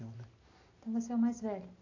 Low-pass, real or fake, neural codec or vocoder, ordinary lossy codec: 7.2 kHz; real; none; AAC, 32 kbps